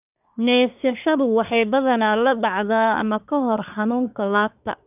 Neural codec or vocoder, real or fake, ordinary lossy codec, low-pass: codec, 24 kHz, 1 kbps, SNAC; fake; none; 3.6 kHz